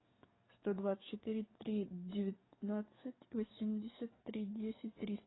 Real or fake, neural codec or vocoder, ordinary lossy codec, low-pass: fake; codec, 44.1 kHz, 7.8 kbps, DAC; AAC, 16 kbps; 7.2 kHz